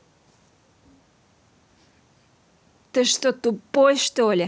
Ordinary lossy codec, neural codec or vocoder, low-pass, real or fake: none; none; none; real